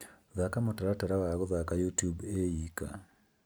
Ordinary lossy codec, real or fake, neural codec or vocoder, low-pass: none; real; none; none